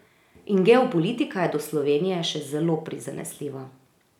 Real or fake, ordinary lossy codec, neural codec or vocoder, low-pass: real; none; none; 19.8 kHz